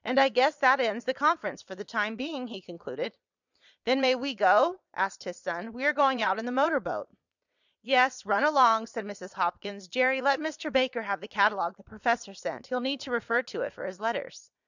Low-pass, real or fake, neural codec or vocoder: 7.2 kHz; fake; vocoder, 22.05 kHz, 80 mel bands, Vocos